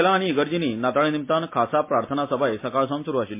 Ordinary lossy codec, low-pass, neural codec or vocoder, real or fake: MP3, 24 kbps; 3.6 kHz; none; real